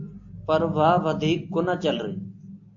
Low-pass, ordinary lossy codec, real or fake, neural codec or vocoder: 7.2 kHz; AAC, 48 kbps; real; none